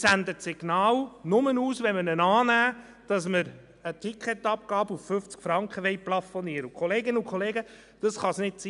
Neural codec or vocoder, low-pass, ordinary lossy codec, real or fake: none; 10.8 kHz; none; real